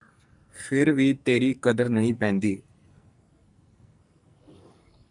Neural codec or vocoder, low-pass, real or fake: codec, 44.1 kHz, 2.6 kbps, SNAC; 10.8 kHz; fake